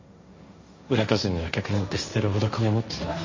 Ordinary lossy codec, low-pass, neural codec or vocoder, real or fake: MP3, 32 kbps; 7.2 kHz; codec, 16 kHz, 1.1 kbps, Voila-Tokenizer; fake